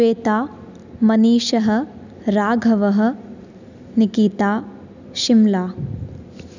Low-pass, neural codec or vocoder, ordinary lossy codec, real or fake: 7.2 kHz; none; none; real